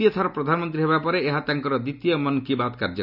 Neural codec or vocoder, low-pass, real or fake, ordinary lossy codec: none; 5.4 kHz; real; none